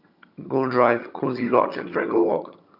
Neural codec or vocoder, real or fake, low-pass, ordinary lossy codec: vocoder, 22.05 kHz, 80 mel bands, HiFi-GAN; fake; 5.4 kHz; none